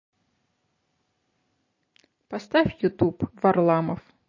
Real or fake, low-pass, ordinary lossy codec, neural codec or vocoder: real; 7.2 kHz; MP3, 32 kbps; none